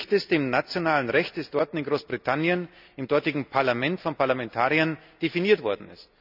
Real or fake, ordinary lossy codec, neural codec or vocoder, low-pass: real; none; none; 5.4 kHz